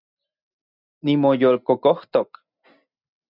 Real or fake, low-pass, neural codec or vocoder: real; 5.4 kHz; none